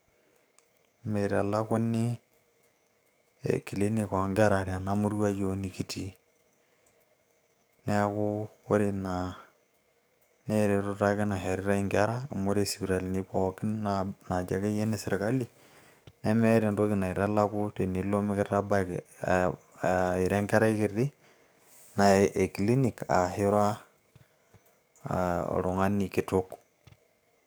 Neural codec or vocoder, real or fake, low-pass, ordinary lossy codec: codec, 44.1 kHz, 7.8 kbps, DAC; fake; none; none